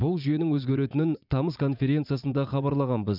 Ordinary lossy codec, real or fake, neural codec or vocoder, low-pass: none; fake; vocoder, 44.1 kHz, 80 mel bands, Vocos; 5.4 kHz